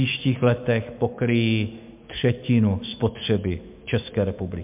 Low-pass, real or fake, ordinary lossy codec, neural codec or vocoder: 3.6 kHz; fake; MP3, 32 kbps; autoencoder, 48 kHz, 128 numbers a frame, DAC-VAE, trained on Japanese speech